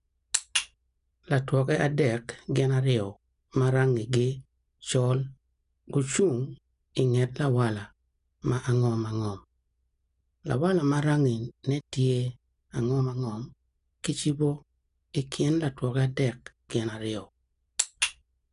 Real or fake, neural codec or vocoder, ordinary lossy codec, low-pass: real; none; none; 10.8 kHz